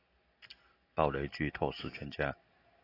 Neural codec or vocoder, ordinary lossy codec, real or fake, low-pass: none; AAC, 24 kbps; real; 5.4 kHz